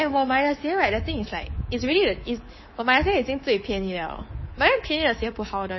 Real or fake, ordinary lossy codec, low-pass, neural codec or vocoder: fake; MP3, 24 kbps; 7.2 kHz; codec, 24 kHz, 3.1 kbps, DualCodec